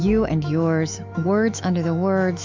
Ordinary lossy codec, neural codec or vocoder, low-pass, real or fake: MP3, 64 kbps; autoencoder, 48 kHz, 128 numbers a frame, DAC-VAE, trained on Japanese speech; 7.2 kHz; fake